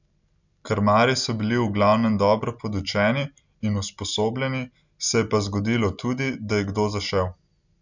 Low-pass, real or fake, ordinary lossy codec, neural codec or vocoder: 7.2 kHz; real; none; none